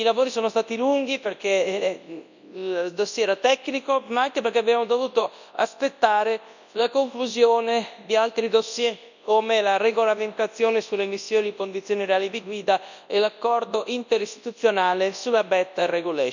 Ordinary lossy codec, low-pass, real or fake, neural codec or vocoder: none; 7.2 kHz; fake; codec, 24 kHz, 0.9 kbps, WavTokenizer, large speech release